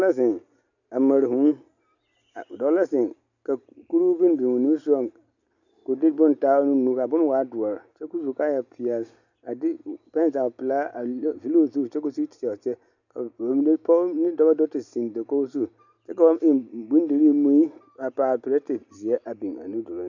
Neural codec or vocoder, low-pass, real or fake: none; 7.2 kHz; real